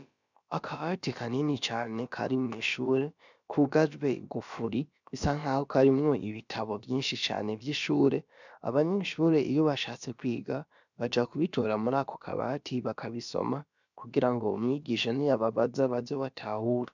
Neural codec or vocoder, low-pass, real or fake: codec, 16 kHz, about 1 kbps, DyCAST, with the encoder's durations; 7.2 kHz; fake